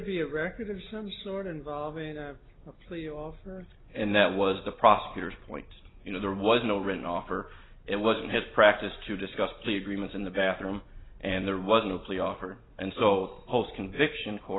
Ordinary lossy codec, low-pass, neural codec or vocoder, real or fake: AAC, 16 kbps; 7.2 kHz; none; real